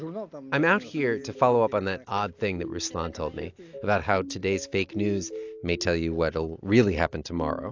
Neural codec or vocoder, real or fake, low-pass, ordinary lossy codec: none; real; 7.2 kHz; AAC, 48 kbps